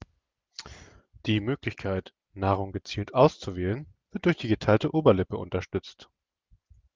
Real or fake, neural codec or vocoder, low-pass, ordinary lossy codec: real; none; 7.2 kHz; Opus, 24 kbps